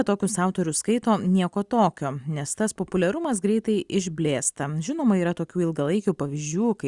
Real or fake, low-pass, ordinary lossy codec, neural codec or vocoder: real; 10.8 kHz; Opus, 64 kbps; none